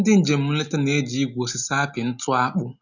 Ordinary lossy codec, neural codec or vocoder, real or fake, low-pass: none; none; real; 7.2 kHz